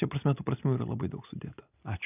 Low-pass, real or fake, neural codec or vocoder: 3.6 kHz; real; none